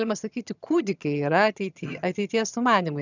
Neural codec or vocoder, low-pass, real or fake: vocoder, 22.05 kHz, 80 mel bands, HiFi-GAN; 7.2 kHz; fake